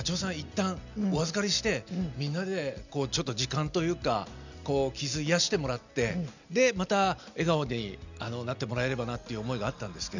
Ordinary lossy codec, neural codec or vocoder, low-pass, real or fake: none; none; 7.2 kHz; real